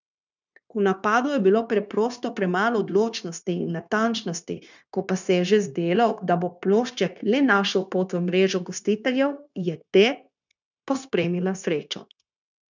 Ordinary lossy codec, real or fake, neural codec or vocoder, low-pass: none; fake; codec, 16 kHz, 0.9 kbps, LongCat-Audio-Codec; 7.2 kHz